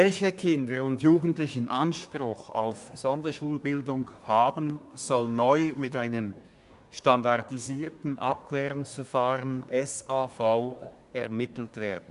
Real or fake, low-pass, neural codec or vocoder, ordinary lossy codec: fake; 10.8 kHz; codec, 24 kHz, 1 kbps, SNAC; none